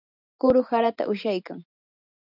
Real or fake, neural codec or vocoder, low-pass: real; none; 5.4 kHz